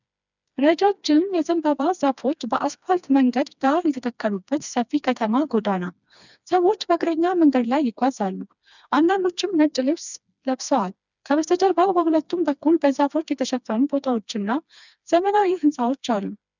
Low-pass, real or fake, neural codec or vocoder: 7.2 kHz; fake; codec, 16 kHz, 2 kbps, FreqCodec, smaller model